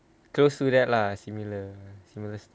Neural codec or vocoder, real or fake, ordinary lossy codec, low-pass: none; real; none; none